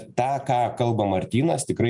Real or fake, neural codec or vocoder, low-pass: real; none; 10.8 kHz